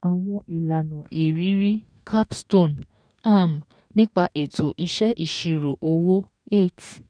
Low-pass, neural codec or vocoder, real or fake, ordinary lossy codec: 9.9 kHz; codec, 44.1 kHz, 2.6 kbps, DAC; fake; none